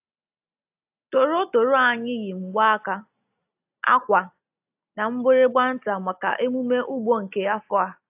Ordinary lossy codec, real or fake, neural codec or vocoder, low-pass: AAC, 32 kbps; real; none; 3.6 kHz